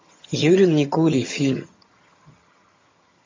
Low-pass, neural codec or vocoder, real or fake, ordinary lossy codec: 7.2 kHz; vocoder, 22.05 kHz, 80 mel bands, HiFi-GAN; fake; MP3, 32 kbps